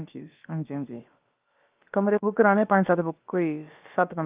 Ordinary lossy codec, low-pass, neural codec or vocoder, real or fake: Opus, 24 kbps; 3.6 kHz; codec, 16 kHz, about 1 kbps, DyCAST, with the encoder's durations; fake